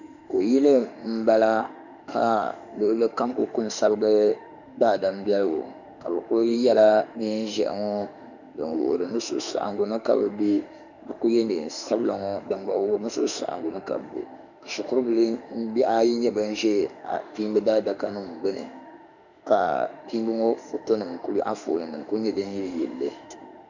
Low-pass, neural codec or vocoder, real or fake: 7.2 kHz; autoencoder, 48 kHz, 32 numbers a frame, DAC-VAE, trained on Japanese speech; fake